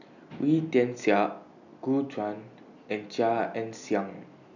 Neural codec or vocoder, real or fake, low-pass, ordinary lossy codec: none; real; 7.2 kHz; none